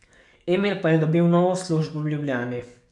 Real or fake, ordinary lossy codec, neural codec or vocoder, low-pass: fake; none; codec, 44.1 kHz, 7.8 kbps, DAC; 10.8 kHz